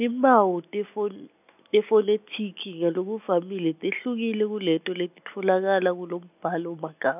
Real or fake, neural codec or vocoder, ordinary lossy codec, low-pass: real; none; none; 3.6 kHz